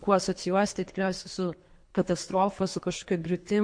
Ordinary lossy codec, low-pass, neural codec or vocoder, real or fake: MP3, 48 kbps; 9.9 kHz; codec, 24 kHz, 3 kbps, HILCodec; fake